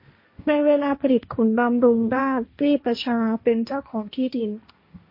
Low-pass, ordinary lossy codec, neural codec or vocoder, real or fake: 5.4 kHz; MP3, 32 kbps; codec, 16 kHz, 1.1 kbps, Voila-Tokenizer; fake